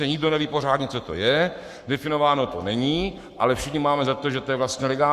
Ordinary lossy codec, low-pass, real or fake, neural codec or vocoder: Opus, 64 kbps; 14.4 kHz; fake; codec, 44.1 kHz, 7.8 kbps, Pupu-Codec